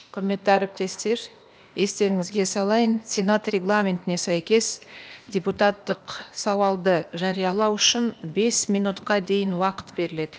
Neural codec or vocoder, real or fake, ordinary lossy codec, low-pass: codec, 16 kHz, 0.8 kbps, ZipCodec; fake; none; none